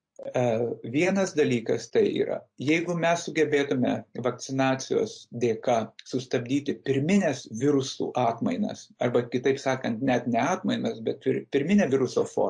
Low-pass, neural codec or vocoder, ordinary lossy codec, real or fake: 9.9 kHz; none; MP3, 48 kbps; real